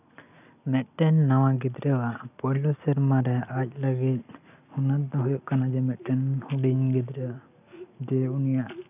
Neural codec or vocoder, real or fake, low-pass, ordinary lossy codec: none; real; 3.6 kHz; none